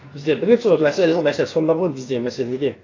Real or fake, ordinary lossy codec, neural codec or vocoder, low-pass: fake; AAC, 32 kbps; codec, 16 kHz, about 1 kbps, DyCAST, with the encoder's durations; 7.2 kHz